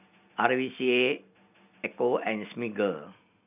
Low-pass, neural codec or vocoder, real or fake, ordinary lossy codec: 3.6 kHz; none; real; none